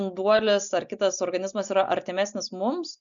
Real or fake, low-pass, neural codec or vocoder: real; 7.2 kHz; none